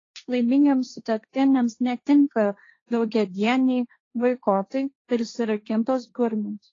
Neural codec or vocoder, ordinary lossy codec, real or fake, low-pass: codec, 16 kHz, 1.1 kbps, Voila-Tokenizer; AAC, 32 kbps; fake; 7.2 kHz